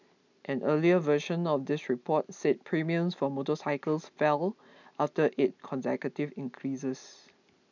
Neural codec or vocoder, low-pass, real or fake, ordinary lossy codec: none; 7.2 kHz; real; none